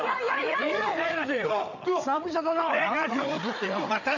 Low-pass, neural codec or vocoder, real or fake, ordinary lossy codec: 7.2 kHz; codec, 16 kHz, 8 kbps, FreqCodec, larger model; fake; none